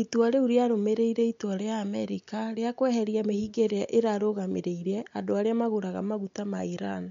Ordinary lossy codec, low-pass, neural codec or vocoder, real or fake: MP3, 64 kbps; 7.2 kHz; none; real